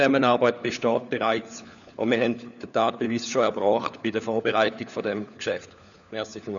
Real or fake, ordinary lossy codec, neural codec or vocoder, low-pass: fake; none; codec, 16 kHz, 4 kbps, FunCodec, trained on LibriTTS, 50 frames a second; 7.2 kHz